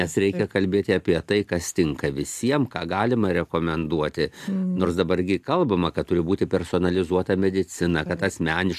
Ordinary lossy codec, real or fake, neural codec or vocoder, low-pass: AAC, 96 kbps; real; none; 14.4 kHz